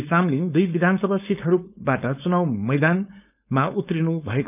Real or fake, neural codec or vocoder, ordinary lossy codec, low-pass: fake; codec, 16 kHz, 4.8 kbps, FACodec; none; 3.6 kHz